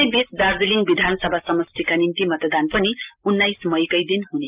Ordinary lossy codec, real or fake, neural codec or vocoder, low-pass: Opus, 32 kbps; real; none; 3.6 kHz